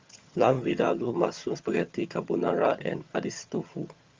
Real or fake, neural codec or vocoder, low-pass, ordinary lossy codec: fake; vocoder, 22.05 kHz, 80 mel bands, HiFi-GAN; 7.2 kHz; Opus, 32 kbps